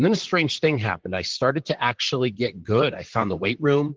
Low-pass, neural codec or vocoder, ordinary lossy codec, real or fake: 7.2 kHz; vocoder, 44.1 kHz, 128 mel bands, Pupu-Vocoder; Opus, 16 kbps; fake